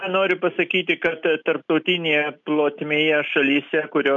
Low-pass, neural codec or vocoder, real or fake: 7.2 kHz; none; real